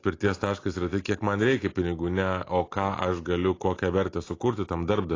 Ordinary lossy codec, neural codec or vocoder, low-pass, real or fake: AAC, 32 kbps; none; 7.2 kHz; real